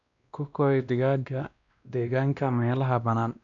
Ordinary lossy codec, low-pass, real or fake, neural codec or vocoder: none; 7.2 kHz; fake; codec, 16 kHz, 1 kbps, X-Codec, WavLM features, trained on Multilingual LibriSpeech